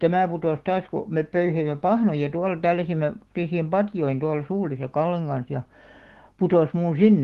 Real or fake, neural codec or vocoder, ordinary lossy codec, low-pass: fake; autoencoder, 48 kHz, 128 numbers a frame, DAC-VAE, trained on Japanese speech; Opus, 16 kbps; 14.4 kHz